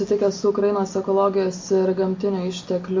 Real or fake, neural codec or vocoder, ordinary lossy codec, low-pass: real; none; MP3, 48 kbps; 7.2 kHz